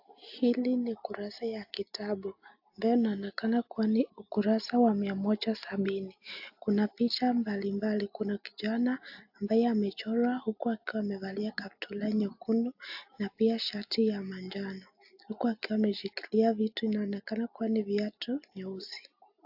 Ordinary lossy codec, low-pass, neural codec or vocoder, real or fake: AAC, 48 kbps; 5.4 kHz; none; real